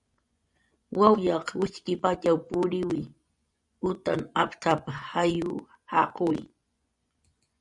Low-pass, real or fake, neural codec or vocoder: 10.8 kHz; fake; vocoder, 24 kHz, 100 mel bands, Vocos